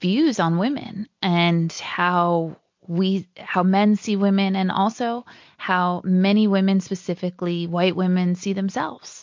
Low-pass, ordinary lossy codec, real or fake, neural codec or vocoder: 7.2 kHz; MP3, 48 kbps; real; none